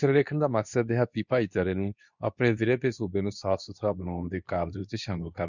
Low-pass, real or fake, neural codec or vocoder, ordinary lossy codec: 7.2 kHz; fake; codec, 24 kHz, 0.9 kbps, WavTokenizer, medium speech release version 2; none